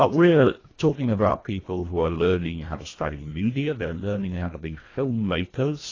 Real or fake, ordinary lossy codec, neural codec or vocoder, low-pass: fake; AAC, 32 kbps; codec, 24 kHz, 1.5 kbps, HILCodec; 7.2 kHz